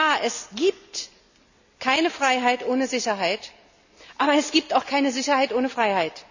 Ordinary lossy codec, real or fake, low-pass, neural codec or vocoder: none; real; 7.2 kHz; none